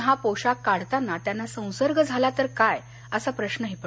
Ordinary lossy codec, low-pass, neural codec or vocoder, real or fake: none; none; none; real